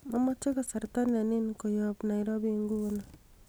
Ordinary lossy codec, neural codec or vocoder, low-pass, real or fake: none; none; none; real